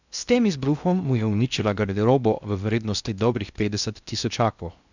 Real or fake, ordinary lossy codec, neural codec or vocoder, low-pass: fake; none; codec, 16 kHz in and 24 kHz out, 0.6 kbps, FocalCodec, streaming, 2048 codes; 7.2 kHz